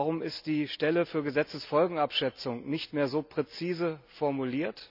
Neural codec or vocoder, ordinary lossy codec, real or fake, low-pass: none; none; real; 5.4 kHz